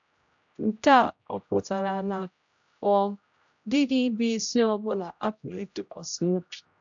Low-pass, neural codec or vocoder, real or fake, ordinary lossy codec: 7.2 kHz; codec, 16 kHz, 0.5 kbps, X-Codec, HuBERT features, trained on general audio; fake; AAC, 64 kbps